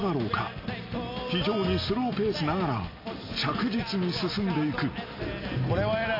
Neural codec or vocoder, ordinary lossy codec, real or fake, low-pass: none; AAC, 32 kbps; real; 5.4 kHz